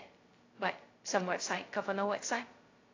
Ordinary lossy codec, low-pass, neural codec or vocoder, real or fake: AAC, 32 kbps; 7.2 kHz; codec, 16 kHz, 0.2 kbps, FocalCodec; fake